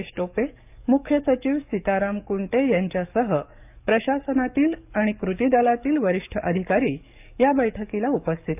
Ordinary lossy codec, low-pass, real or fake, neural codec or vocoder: none; 3.6 kHz; fake; codec, 44.1 kHz, 7.8 kbps, DAC